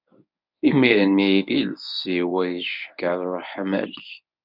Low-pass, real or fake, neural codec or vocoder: 5.4 kHz; fake; codec, 24 kHz, 0.9 kbps, WavTokenizer, medium speech release version 1